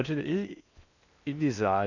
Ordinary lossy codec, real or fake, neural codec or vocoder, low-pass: none; fake; codec, 16 kHz in and 24 kHz out, 0.6 kbps, FocalCodec, streaming, 4096 codes; 7.2 kHz